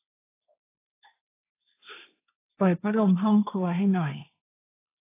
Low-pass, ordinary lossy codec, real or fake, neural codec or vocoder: 3.6 kHz; AAC, 24 kbps; fake; codec, 16 kHz, 1.1 kbps, Voila-Tokenizer